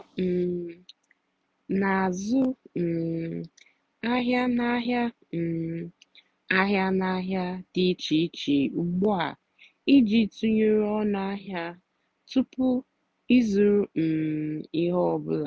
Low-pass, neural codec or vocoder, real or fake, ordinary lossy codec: none; none; real; none